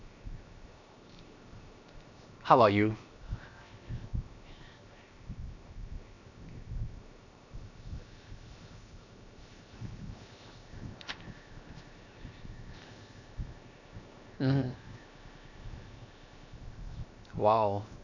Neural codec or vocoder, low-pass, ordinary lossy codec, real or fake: codec, 16 kHz, 0.7 kbps, FocalCodec; 7.2 kHz; none; fake